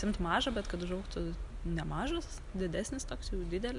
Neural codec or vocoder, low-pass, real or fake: none; 10.8 kHz; real